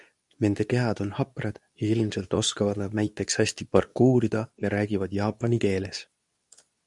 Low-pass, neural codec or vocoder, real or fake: 10.8 kHz; codec, 24 kHz, 0.9 kbps, WavTokenizer, medium speech release version 2; fake